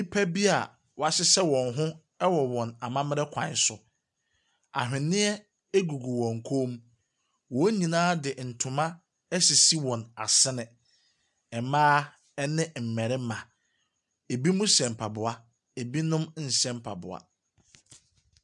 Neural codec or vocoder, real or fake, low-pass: none; real; 10.8 kHz